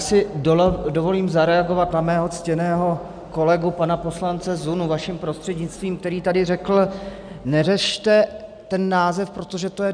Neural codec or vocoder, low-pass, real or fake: none; 9.9 kHz; real